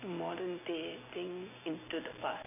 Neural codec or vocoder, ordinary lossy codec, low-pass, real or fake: none; none; 3.6 kHz; real